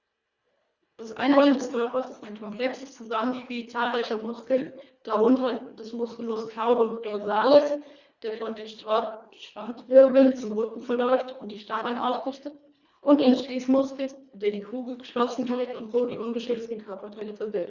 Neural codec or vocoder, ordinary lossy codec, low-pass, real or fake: codec, 24 kHz, 1.5 kbps, HILCodec; Opus, 64 kbps; 7.2 kHz; fake